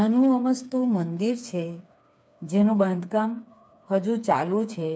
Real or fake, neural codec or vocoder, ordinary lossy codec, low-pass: fake; codec, 16 kHz, 4 kbps, FreqCodec, smaller model; none; none